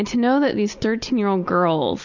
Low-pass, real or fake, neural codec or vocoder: 7.2 kHz; real; none